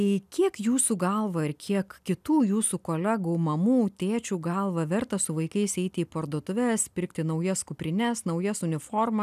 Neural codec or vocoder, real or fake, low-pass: none; real; 14.4 kHz